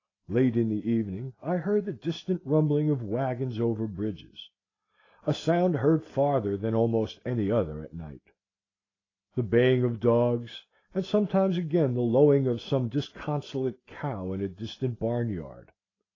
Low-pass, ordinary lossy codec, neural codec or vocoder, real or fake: 7.2 kHz; AAC, 32 kbps; none; real